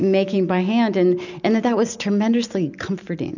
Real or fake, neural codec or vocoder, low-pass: real; none; 7.2 kHz